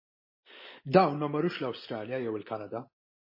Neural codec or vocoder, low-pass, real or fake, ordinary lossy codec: none; 5.4 kHz; real; MP3, 24 kbps